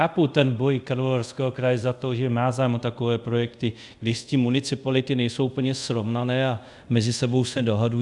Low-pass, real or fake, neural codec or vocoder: 10.8 kHz; fake; codec, 24 kHz, 0.5 kbps, DualCodec